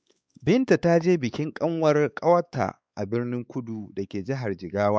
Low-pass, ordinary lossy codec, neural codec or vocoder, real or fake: none; none; codec, 16 kHz, 4 kbps, X-Codec, WavLM features, trained on Multilingual LibriSpeech; fake